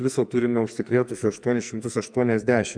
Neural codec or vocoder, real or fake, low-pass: codec, 44.1 kHz, 2.6 kbps, SNAC; fake; 9.9 kHz